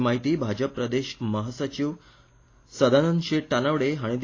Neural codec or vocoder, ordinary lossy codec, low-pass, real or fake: none; AAC, 32 kbps; 7.2 kHz; real